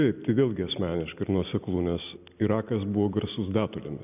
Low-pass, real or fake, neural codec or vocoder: 3.6 kHz; real; none